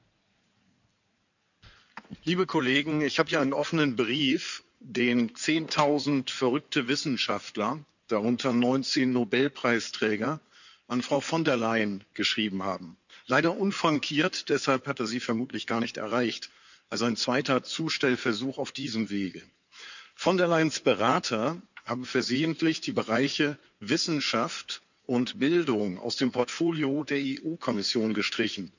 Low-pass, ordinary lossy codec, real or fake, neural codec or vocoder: 7.2 kHz; none; fake; codec, 16 kHz in and 24 kHz out, 2.2 kbps, FireRedTTS-2 codec